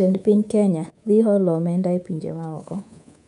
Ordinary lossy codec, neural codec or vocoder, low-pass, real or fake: none; codec, 24 kHz, 3.1 kbps, DualCodec; 10.8 kHz; fake